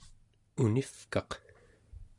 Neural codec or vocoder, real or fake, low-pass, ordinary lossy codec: none; real; 10.8 kHz; MP3, 96 kbps